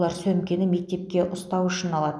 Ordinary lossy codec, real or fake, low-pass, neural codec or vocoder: none; real; none; none